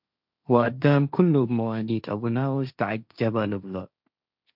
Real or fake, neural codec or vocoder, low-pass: fake; codec, 16 kHz, 1.1 kbps, Voila-Tokenizer; 5.4 kHz